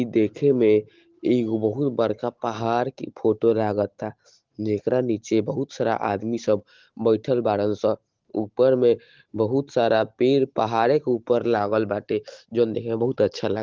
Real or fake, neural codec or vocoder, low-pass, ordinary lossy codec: real; none; 7.2 kHz; Opus, 16 kbps